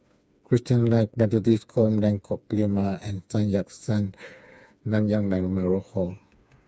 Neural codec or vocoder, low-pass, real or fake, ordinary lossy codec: codec, 16 kHz, 4 kbps, FreqCodec, smaller model; none; fake; none